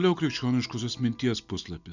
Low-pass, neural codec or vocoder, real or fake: 7.2 kHz; none; real